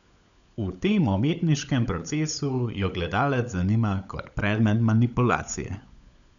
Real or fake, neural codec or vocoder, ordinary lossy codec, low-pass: fake; codec, 16 kHz, 16 kbps, FunCodec, trained on LibriTTS, 50 frames a second; none; 7.2 kHz